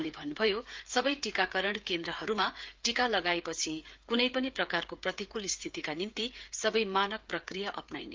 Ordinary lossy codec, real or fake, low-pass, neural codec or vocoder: Opus, 32 kbps; fake; 7.2 kHz; codec, 16 kHz, 8 kbps, FreqCodec, smaller model